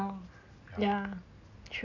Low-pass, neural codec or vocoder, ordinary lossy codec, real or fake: 7.2 kHz; none; none; real